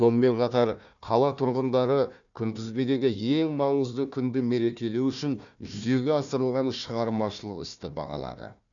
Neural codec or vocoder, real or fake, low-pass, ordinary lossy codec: codec, 16 kHz, 1 kbps, FunCodec, trained on Chinese and English, 50 frames a second; fake; 7.2 kHz; none